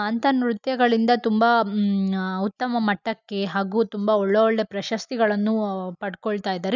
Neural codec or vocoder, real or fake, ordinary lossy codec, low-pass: none; real; none; 7.2 kHz